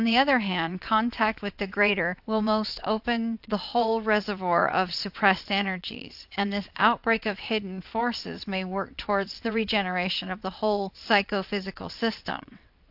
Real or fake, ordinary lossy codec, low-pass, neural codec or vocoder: fake; AAC, 48 kbps; 5.4 kHz; vocoder, 22.05 kHz, 80 mel bands, WaveNeXt